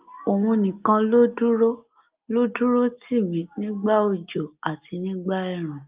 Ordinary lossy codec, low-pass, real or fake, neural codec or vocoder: Opus, 24 kbps; 3.6 kHz; real; none